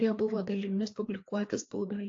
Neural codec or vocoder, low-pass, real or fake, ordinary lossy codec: codec, 16 kHz, 2 kbps, FreqCodec, larger model; 7.2 kHz; fake; AAC, 48 kbps